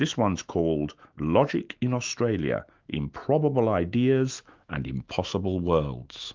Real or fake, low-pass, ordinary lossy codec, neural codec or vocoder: fake; 7.2 kHz; Opus, 24 kbps; autoencoder, 48 kHz, 128 numbers a frame, DAC-VAE, trained on Japanese speech